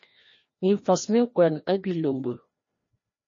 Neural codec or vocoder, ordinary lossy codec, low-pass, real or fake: codec, 16 kHz, 1 kbps, FreqCodec, larger model; MP3, 32 kbps; 7.2 kHz; fake